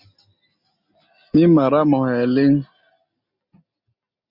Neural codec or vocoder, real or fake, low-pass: none; real; 5.4 kHz